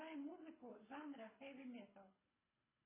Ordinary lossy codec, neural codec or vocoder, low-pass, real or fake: MP3, 16 kbps; codec, 24 kHz, 6 kbps, HILCodec; 3.6 kHz; fake